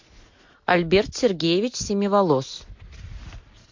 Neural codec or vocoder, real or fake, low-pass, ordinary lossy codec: none; real; 7.2 kHz; MP3, 48 kbps